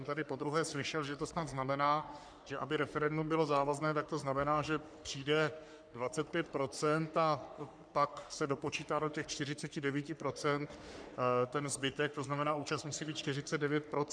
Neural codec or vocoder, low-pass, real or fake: codec, 44.1 kHz, 3.4 kbps, Pupu-Codec; 9.9 kHz; fake